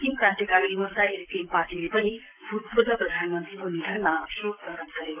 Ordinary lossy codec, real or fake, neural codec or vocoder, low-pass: none; fake; codec, 44.1 kHz, 7.8 kbps, Pupu-Codec; 3.6 kHz